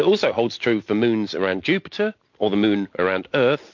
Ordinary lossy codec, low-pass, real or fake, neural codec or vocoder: MP3, 48 kbps; 7.2 kHz; real; none